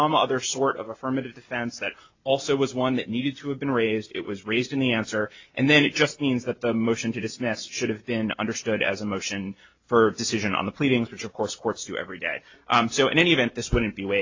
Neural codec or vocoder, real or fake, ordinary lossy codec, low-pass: none; real; AAC, 48 kbps; 7.2 kHz